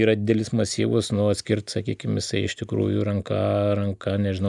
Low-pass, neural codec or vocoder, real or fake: 10.8 kHz; none; real